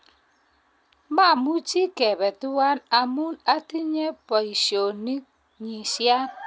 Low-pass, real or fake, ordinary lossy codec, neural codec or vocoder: none; real; none; none